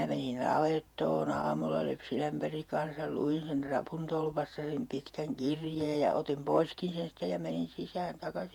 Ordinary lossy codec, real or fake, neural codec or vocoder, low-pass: none; fake; vocoder, 48 kHz, 128 mel bands, Vocos; 19.8 kHz